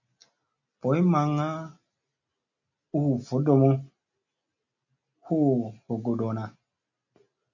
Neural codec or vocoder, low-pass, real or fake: none; 7.2 kHz; real